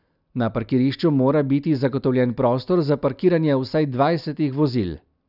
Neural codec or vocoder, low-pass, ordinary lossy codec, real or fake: none; 5.4 kHz; none; real